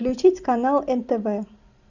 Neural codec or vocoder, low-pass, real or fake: none; 7.2 kHz; real